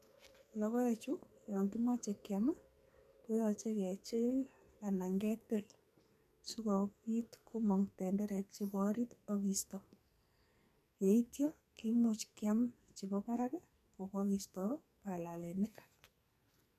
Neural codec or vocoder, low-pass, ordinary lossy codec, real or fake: codec, 44.1 kHz, 2.6 kbps, SNAC; 14.4 kHz; MP3, 96 kbps; fake